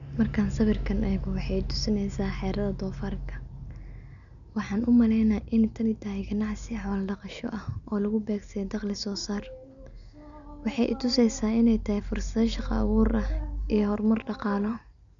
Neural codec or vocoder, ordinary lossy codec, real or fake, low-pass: none; none; real; 7.2 kHz